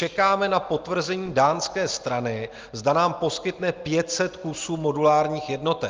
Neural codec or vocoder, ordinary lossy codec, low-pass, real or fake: none; Opus, 24 kbps; 7.2 kHz; real